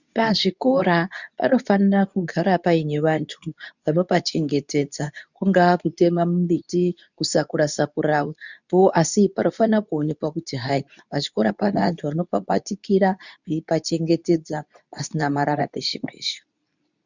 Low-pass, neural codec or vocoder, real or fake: 7.2 kHz; codec, 24 kHz, 0.9 kbps, WavTokenizer, medium speech release version 2; fake